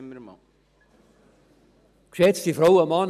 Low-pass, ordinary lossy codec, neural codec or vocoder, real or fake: 14.4 kHz; none; none; real